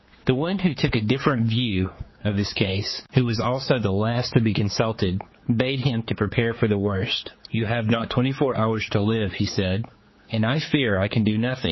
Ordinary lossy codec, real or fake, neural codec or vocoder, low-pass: MP3, 24 kbps; fake; codec, 16 kHz, 4 kbps, X-Codec, HuBERT features, trained on general audio; 7.2 kHz